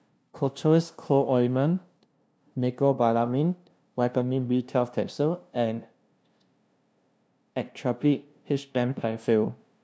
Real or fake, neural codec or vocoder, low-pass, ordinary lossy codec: fake; codec, 16 kHz, 0.5 kbps, FunCodec, trained on LibriTTS, 25 frames a second; none; none